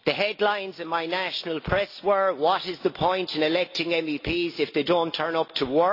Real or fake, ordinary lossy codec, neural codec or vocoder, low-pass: real; AAC, 32 kbps; none; 5.4 kHz